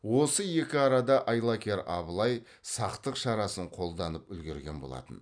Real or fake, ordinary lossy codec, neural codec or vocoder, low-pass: real; none; none; 9.9 kHz